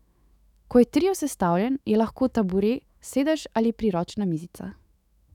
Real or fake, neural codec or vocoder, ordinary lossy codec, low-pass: fake; autoencoder, 48 kHz, 128 numbers a frame, DAC-VAE, trained on Japanese speech; none; 19.8 kHz